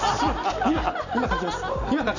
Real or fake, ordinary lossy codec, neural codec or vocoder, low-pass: real; none; none; 7.2 kHz